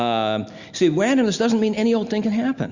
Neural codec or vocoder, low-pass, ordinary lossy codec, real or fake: none; 7.2 kHz; Opus, 64 kbps; real